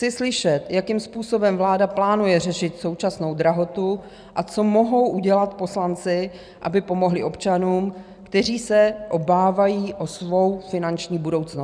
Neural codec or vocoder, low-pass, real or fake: none; 9.9 kHz; real